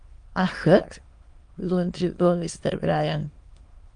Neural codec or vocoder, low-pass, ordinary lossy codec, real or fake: autoencoder, 22.05 kHz, a latent of 192 numbers a frame, VITS, trained on many speakers; 9.9 kHz; Opus, 32 kbps; fake